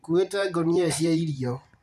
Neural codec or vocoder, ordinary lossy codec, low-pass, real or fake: vocoder, 44.1 kHz, 128 mel bands every 512 samples, BigVGAN v2; none; 14.4 kHz; fake